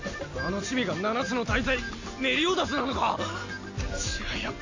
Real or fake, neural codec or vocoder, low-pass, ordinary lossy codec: real; none; 7.2 kHz; AAC, 48 kbps